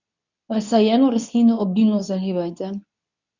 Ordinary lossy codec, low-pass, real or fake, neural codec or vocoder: none; 7.2 kHz; fake; codec, 24 kHz, 0.9 kbps, WavTokenizer, medium speech release version 2